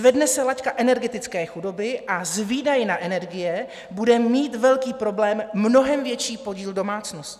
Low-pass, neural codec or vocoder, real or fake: 14.4 kHz; none; real